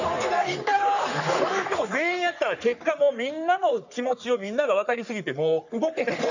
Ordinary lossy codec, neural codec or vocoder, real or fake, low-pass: none; codec, 44.1 kHz, 3.4 kbps, Pupu-Codec; fake; 7.2 kHz